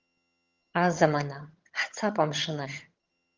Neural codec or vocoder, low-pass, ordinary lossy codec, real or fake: vocoder, 22.05 kHz, 80 mel bands, HiFi-GAN; 7.2 kHz; Opus, 32 kbps; fake